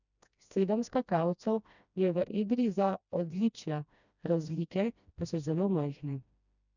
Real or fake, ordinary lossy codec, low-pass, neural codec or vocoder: fake; none; 7.2 kHz; codec, 16 kHz, 1 kbps, FreqCodec, smaller model